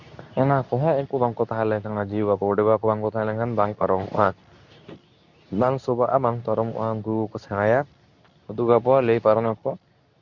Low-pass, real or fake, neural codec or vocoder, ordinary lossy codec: 7.2 kHz; fake; codec, 24 kHz, 0.9 kbps, WavTokenizer, medium speech release version 2; none